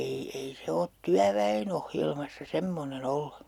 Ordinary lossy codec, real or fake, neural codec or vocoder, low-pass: none; real; none; 19.8 kHz